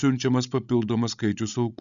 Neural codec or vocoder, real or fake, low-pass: codec, 16 kHz, 16 kbps, FreqCodec, larger model; fake; 7.2 kHz